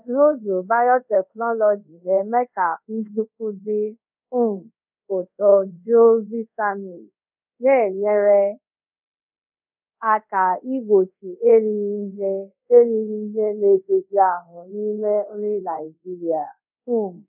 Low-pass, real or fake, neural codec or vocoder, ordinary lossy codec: 3.6 kHz; fake; codec, 24 kHz, 0.5 kbps, DualCodec; none